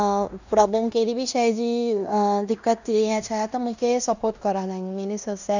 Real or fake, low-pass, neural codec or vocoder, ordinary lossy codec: fake; 7.2 kHz; codec, 16 kHz in and 24 kHz out, 0.9 kbps, LongCat-Audio-Codec, fine tuned four codebook decoder; none